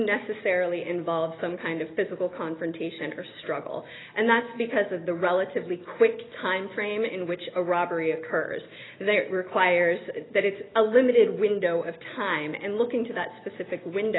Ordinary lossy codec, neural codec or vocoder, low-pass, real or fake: AAC, 16 kbps; none; 7.2 kHz; real